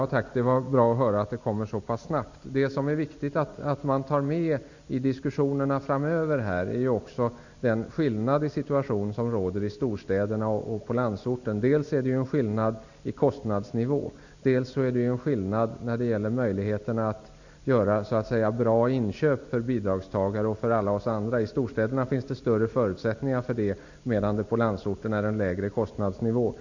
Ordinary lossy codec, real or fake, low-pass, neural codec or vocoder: none; real; 7.2 kHz; none